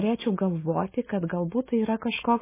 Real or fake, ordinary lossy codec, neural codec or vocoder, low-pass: real; MP3, 16 kbps; none; 3.6 kHz